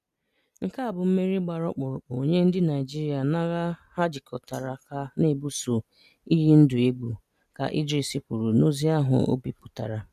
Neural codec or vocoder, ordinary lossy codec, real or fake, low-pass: none; none; real; 14.4 kHz